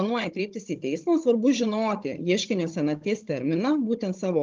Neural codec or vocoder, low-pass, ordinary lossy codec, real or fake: codec, 16 kHz, 16 kbps, FunCodec, trained on Chinese and English, 50 frames a second; 7.2 kHz; Opus, 32 kbps; fake